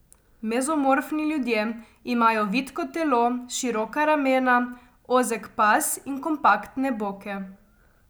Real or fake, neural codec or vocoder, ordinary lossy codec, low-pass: real; none; none; none